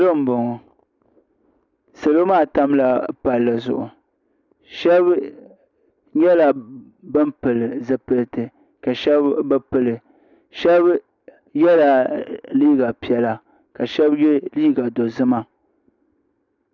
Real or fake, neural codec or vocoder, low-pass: real; none; 7.2 kHz